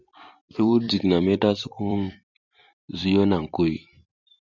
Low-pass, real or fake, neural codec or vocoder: 7.2 kHz; fake; vocoder, 44.1 kHz, 128 mel bands every 256 samples, BigVGAN v2